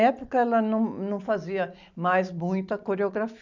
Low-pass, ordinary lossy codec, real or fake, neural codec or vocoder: 7.2 kHz; none; real; none